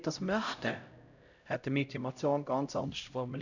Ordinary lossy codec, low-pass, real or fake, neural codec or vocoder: none; 7.2 kHz; fake; codec, 16 kHz, 0.5 kbps, X-Codec, HuBERT features, trained on LibriSpeech